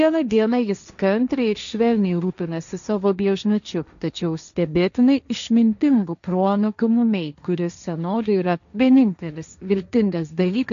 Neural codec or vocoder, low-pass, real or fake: codec, 16 kHz, 1.1 kbps, Voila-Tokenizer; 7.2 kHz; fake